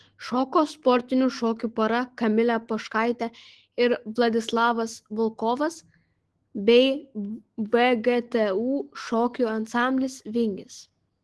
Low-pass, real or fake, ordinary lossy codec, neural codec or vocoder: 10.8 kHz; fake; Opus, 16 kbps; autoencoder, 48 kHz, 128 numbers a frame, DAC-VAE, trained on Japanese speech